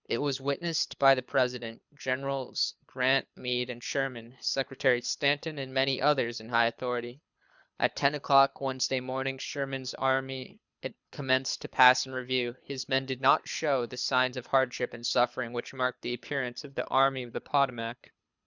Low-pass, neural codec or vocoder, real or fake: 7.2 kHz; codec, 24 kHz, 6 kbps, HILCodec; fake